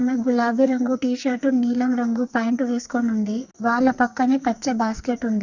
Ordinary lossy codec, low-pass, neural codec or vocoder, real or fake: Opus, 64 kbps; 7.2 kHz; codec, 44.1 kHz, 2.6 kbps, SNAC; fake